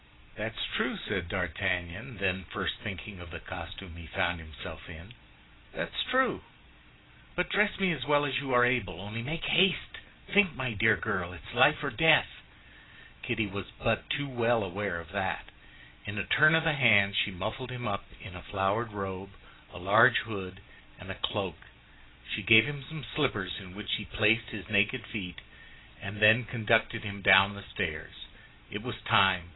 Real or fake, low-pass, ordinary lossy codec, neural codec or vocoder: real; 7.2 kHz; AAC, 16 kbps; none